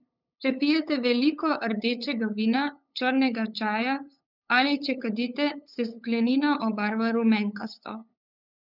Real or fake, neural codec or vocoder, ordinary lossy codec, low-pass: fake; codec, 16 kHz, 8 kbps, FunCodec, trained on LibriTTS, 25 frames a second; none; 5.4 kHz